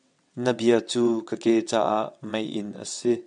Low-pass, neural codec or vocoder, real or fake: 9.9 kHz; vocoder, 22.05 kHz, 80 mel bands, WaveNeXt; fake